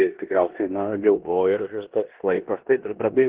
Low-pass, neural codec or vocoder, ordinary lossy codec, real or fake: 3.6 kHz; codec, 16 kHz in and 24 kHz out, 0.9 kbps, LongCat-Audio-Codec, four codebook decoder; Opus, 16 kbps; fake